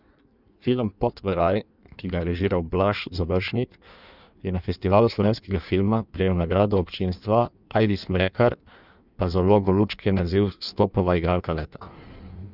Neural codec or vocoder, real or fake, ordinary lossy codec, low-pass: codec, 16 kHz in and 24 kHz out, 1.1 kbps, FireRedTTS-2 codec; fake; none; 5.4 kHz